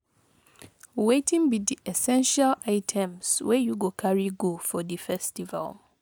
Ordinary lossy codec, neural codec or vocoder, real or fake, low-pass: none; none; real; none